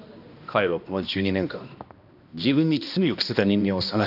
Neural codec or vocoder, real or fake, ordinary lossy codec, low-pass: codec, 16 kHz, 1 kbps, X-Codec, HuBERT features, trained on balanced general audio; fake; none; 5.4 kHz